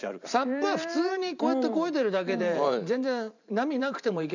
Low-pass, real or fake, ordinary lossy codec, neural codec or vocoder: 7.2 kHz; fake; none; vocoder, 44.1 kHz, 128 mel bands every 512 samples, BigVGAN v2